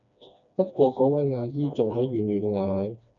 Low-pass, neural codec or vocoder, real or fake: 7.2 kHz; codec, 16 kHz, 2 kbps, FreqCodec, smaller model; fake